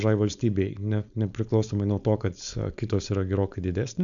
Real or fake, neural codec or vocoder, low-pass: fake; codec, 16 kHz, 4.8 kbps, FACodec; 7.2 kHz